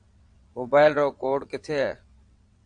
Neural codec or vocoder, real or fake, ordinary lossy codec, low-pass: vocoder, 22.05 kHz, 80 mel bands, WaveNeXt; fake; MP3, 64 kbps; 9.9 kHz